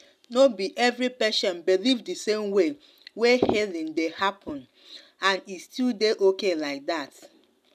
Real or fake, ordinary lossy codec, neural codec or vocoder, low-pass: real; none; none; 14.4 kHz